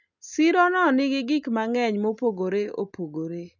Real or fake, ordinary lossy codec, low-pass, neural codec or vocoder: real; none; 7.2 kHz; none